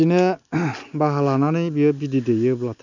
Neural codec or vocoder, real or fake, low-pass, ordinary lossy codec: none; real; 7.2 kHz; none